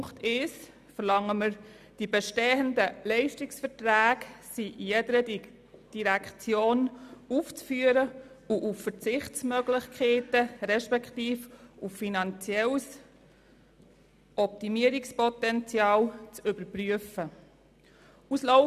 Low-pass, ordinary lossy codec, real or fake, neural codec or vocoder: 14.4 kHz; none; real; none